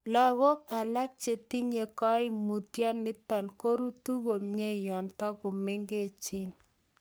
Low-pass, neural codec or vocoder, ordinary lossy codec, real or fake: none; codec, 44.1 kHz, 3.4 kbps, Pupu-Codec; none; fake